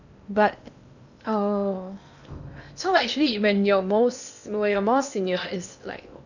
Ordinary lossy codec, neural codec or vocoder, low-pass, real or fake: none; codec, 16 kHz in and 24 kHz out, 0.8 kbps, FocalCodec, streaming, 65536 codes; 7.2 kHz; fake